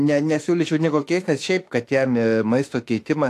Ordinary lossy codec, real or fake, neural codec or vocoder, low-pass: AAC, 64 kbps; fake; autoencoder, 48 kHz, 32 numbers a frame, DAC-VAE, trained on Japanese speech; 14.4 kHz